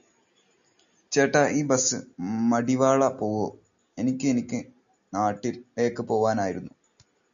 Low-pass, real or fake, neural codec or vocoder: 7.2 kHz; real; none